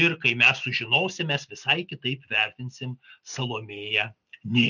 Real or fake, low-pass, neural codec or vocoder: real; 7.2 kHz; none